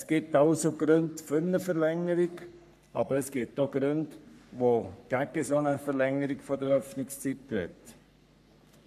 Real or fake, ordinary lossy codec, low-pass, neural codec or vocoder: fake; none; 14.4 kHz; codec, 44.1 kHz, 3.4 kbps, Pupu-Codec